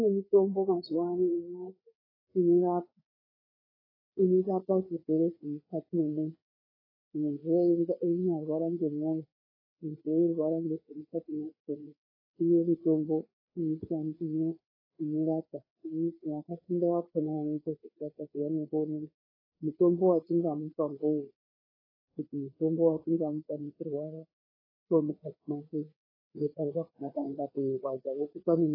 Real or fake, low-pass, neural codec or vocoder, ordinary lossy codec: fake; 5.4 kHz; codec, 16 kHz, 4 kbps, FreqCodec, larger model; AAC, 24 kbps